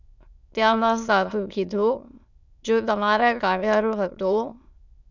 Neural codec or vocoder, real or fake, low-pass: autoencoder, 22.05 kHz, a latent of 192 numbers a frame, VITS, trained on many speakers; fake; 7.2 kHz